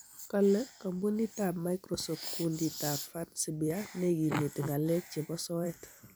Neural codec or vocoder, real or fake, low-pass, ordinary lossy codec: vocoder, 44.1 kHz, 128 mel bands every 256 samples, BigVGAN v2; fake; none; none